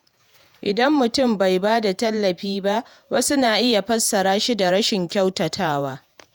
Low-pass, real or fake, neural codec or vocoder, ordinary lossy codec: none; fake; vocoder, 48 kHz, 128 mel bands, Vocos; none